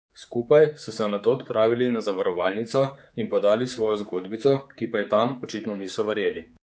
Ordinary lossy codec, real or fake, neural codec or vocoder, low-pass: none; fake; codec, 16 kHz, 4 kbps, X-Codec, HuBERT features, trained on general audio; none